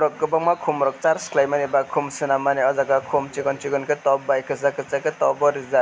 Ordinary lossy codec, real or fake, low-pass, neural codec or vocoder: none; real; none; none